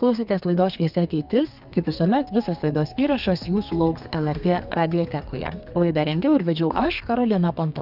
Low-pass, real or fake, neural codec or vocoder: 5.4 kHz; fake; codec, 32 kHz, 1.9 kbps, SNAC